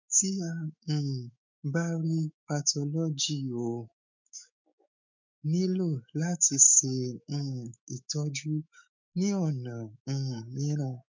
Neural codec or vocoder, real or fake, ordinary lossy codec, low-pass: autoencoder, 48 kHz, 128 numbers a frame, DAC-VAE, trained on Japanese speech; fake; MP3, 64 kbps; 7.2 kHz